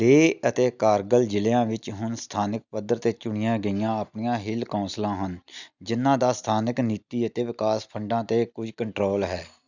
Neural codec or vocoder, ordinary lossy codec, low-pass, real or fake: none; none; 7.2 kHz; real